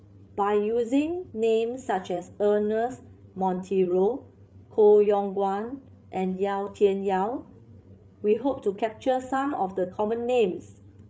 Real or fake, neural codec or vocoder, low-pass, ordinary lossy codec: fake; codec, 16 kHz, 8 kbps, FreqCodec, larger model; none; none